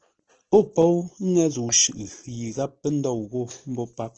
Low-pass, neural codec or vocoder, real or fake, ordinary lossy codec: 7.2 kHz; none; real; Opus, 16 kbps